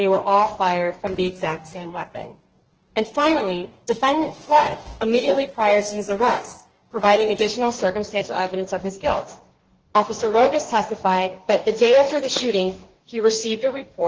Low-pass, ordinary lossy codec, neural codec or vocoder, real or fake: 7.2 kHz; Opus, 16 kbps; codec, 44.1 kHz, 2.6 kbps, DAC; fake